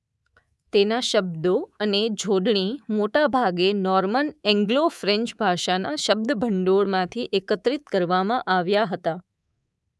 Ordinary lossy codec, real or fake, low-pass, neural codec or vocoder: none; fake; none; codec, 24 kHz, 3.1 kbps, DualCodec